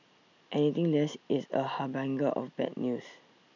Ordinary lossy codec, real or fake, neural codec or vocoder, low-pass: none; real; none; 7.2 kHz